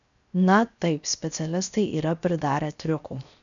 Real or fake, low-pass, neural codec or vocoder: fake; 7.2 kHz; codec, 16 kHz, 0.7 kbps, FocalCodec